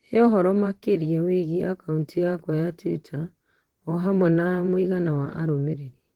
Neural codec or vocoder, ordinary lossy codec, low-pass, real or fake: vocoder, 44.1 kHz, 128 mel bands, Pupu-Vocoder; Opus, 16 kbps; 19.8 kHz; fake